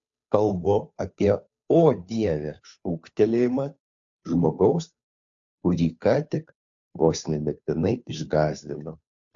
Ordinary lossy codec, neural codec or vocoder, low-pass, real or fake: AAC, 64 kbps; codec, 16 kHz, 2 kbps, FunCodec, trained on Chinese and English, 25 frames a second; 7.2 kHz; fake